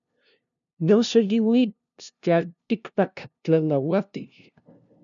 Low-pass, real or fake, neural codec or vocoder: 7.2 kHz; fake; codec, 16 kHz, 0.5 kbps, FunCodec, trained on LibriTTS, 25 frames a second